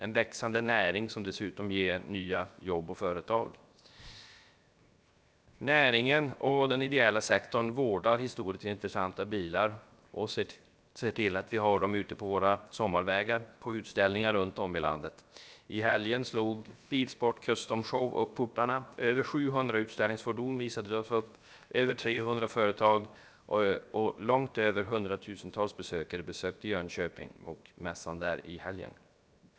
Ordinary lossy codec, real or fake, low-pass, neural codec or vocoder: none; fake; none; codec, 16 kHz, 0.7 kbps, FocalCodec